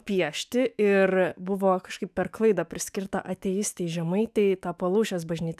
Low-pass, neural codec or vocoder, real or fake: 14.4 kHz; autoencoder, 48 kHz, 128 numbers a frame, DAC-VAE, trained on Japanese speech; fake